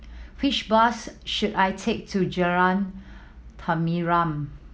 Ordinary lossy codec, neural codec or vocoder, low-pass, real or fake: none; none; none; real